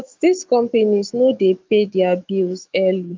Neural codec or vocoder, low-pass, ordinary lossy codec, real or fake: autoencoder, 48 kHz, 128 numbers a frame, DAC-VAE, trained on Japanese speech; 7.2 kHz; Opus, 24 kbps; fake